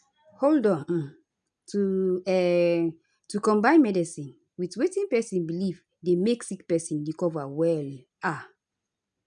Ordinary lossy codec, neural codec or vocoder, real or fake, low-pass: none; none; real; 9.9 kHz